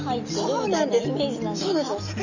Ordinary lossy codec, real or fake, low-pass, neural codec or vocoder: none; real; 7.2 kHz; none